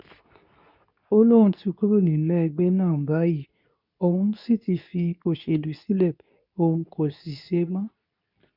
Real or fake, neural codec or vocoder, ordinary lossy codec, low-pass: fake; codec, 24 kHz, 0.9 kbps, WavTokenizer, medium speech release version 2; none; 5.4 kHz